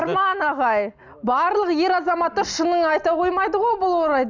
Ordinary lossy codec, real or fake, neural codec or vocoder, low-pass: none; real; none; 7.2 kHz